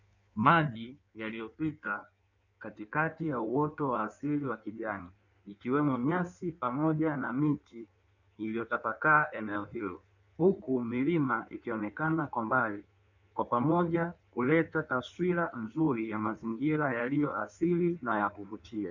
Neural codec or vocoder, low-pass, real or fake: codec, 16 kHz in and 24 kHz out, 1.1 kbps, FireRedTTS-2 codec; 7.2 kHz; fake